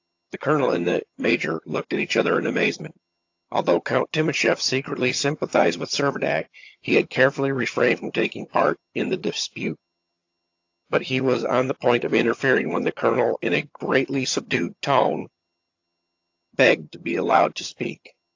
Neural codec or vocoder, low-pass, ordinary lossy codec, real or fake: vocoder, 22.05 kHz, 80 mel bands, HiFi-GAN; 7.2 kHz; AAC, 48 kbps; fake